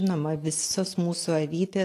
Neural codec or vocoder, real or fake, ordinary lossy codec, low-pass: none; real; AAC, 48 kbps; 14.4 kHz